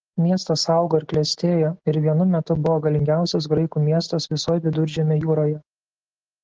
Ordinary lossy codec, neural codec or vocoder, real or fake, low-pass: Opus, 16 kbps; none; real; 7.2 kHz